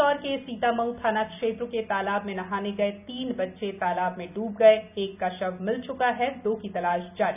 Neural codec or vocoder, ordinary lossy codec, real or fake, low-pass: none; none; real; 3.6 kHz